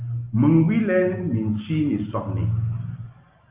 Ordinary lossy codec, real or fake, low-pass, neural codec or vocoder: Opus, 24 kbps; real; 3.6 kHz; none